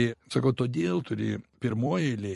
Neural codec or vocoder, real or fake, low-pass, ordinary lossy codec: vocoder, 44.1 kHz, 128 mel bands every 256 samples, BigVGAN v2; fake; 14.4 kHz; MP3, 48 kbps